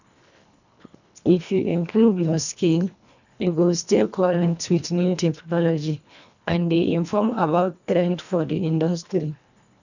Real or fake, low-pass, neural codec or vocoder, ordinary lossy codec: fake; 7.2 kHz; codec, 24 kHz, 1.5 kbps, HILCodec; none